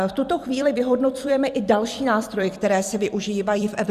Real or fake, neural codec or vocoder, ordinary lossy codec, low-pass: fake; vocoder, 44.1 kHz, 128 mel bands every 256 samples, BigVGAN v2; Opus, 64 kbps; 14.4 kHz